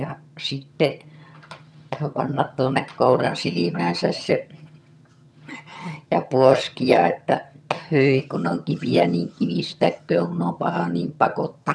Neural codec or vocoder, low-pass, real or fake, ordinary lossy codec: vocoder, 22.05 kHz, 80 mel bands, HiFi-GAN; none; fake; none